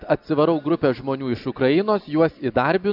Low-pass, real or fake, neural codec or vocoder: 5.4 kHz; real; none